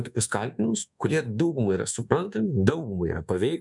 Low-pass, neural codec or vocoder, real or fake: 10.8 kHz; codec, 24 kHz, 1.2 kbps, DualCodec; fake